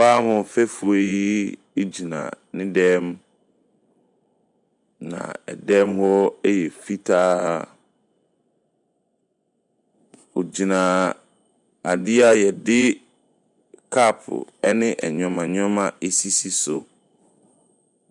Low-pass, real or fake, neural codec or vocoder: 10.8 kHz; fake; vocoder, 24 kHz, 100 mel bands, Vocos